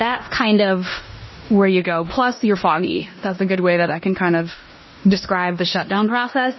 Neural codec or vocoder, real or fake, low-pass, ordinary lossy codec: codec, 16 kHz in and 24 kHz out, 0.9 kbps, LongCat-Audio-Codec, four codebook decoder; fake; 7.2 kHz; MP3, 24 kbps